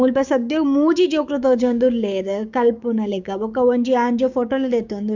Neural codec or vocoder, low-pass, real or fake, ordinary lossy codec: codec, 16 kHz, 6 kbps, DAC; 7.2 kHz; fake; none